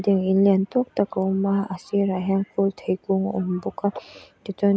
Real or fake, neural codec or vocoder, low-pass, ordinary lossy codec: real; none; none; none